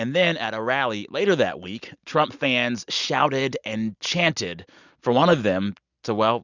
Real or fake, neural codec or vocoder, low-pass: real; none; 7.2 kHz